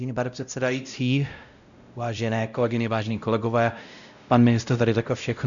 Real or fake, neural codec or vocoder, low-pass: fake; codec, 16 kHz, 0.5 kbps, X-Codec, WavLM features, trained on Multilingual LibriSpeech; 7.2 kHz